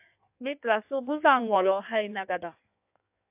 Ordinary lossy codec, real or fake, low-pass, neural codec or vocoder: AAC, 32 kbps; fake; 3.6 kHz; codec, 16 kHz in and 24 kHz out, 1.1 kbps, FireRedTTS-2 codec